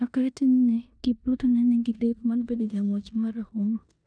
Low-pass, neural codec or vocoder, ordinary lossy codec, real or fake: 9.9 kHz; codec, 16 kHz in and 24 kHz out, 0.9 kbps, LongCat-Audio-Codec, four codebook decoder; none; fake